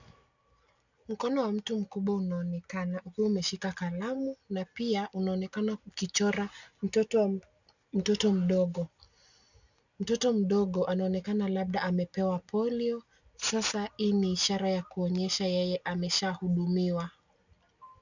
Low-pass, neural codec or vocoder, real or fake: 7.2 kHz; none; real